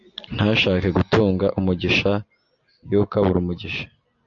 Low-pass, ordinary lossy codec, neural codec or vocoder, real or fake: 7.2 kHz; AAC, 64 kbps; none; real